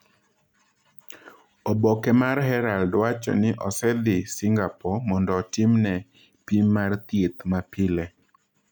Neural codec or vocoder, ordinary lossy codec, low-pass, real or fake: none; none; 19.8 kHz; real